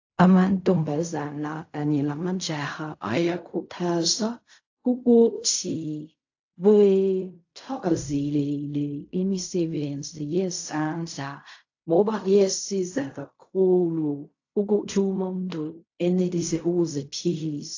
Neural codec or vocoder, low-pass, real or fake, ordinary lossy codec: codec, 16 kHz in and 24 kHz out, 0.4 kbps, LongCat-Audio-Codec, fine tuned four codebook decoder; 7.2 kHz; fake; AAC, 48 kbps